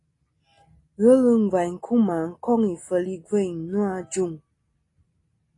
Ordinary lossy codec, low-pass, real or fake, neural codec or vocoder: AAC, 32 kbps; 10.8 kHz; real; none